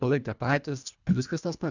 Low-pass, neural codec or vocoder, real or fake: 7.2 kHz; codec, 24 kHz, 1.5 kbps, HILCodec; fake